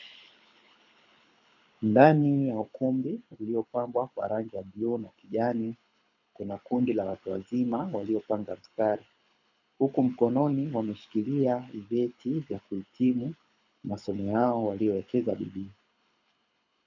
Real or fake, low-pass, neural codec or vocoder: fake; 7.2 kHz; codec, 24 kHz, 6 kbps, HILCodec